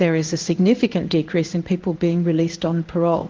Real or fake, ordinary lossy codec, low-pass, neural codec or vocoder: fake; Opus, 16 kbps; 7.2 kHz; codec, 16 kHz in and 24 kHz out, 1 kbps, XY-Tokenizer